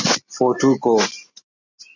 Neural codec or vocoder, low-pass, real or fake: none; 7.2 kHz; real